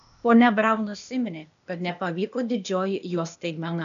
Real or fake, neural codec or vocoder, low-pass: fake; codec, 16 kHz, 0.8 kbps, ZipCodec; 7.2 kHz